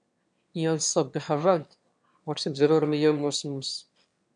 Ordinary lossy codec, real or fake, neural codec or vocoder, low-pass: MP3, 64 kbps; fake; autoencoder, 22.05 kHz, a latent of 192 numbers a frame, VITS, trained on one speaker; 9.9 kHz